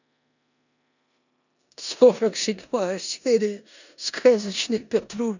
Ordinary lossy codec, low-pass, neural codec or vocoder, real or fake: none; 7.2 kHz; codec, 16 kHz in and 24 kHz out, 0.9 kbps, LongCat-Audio-Codec, four codebook decoder; fake